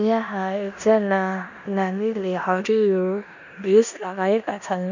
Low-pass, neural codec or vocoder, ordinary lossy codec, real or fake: 7.2 kHz; codec, 16 kHz in and 24 kHz out, 0.9 kbps, LongCat-Audio-Codec, four codebook decoder; none; fake